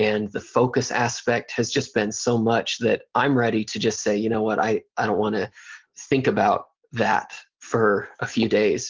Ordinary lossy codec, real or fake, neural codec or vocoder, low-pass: Opus, 16 kbps; real; none; 7.2 kHz